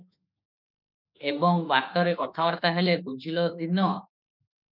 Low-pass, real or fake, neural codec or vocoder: 5.4 kHz; fake; autoencoder, 48 kHz, 32 numbers a frame, DAC-VAE, trained on Japanese speech